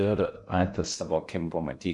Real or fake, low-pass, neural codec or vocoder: fake; 10.8 kHz; codec, 16 kHz in and 24 kHz out, 0.6 kbps, FocalCodec, streaming, 4096 codes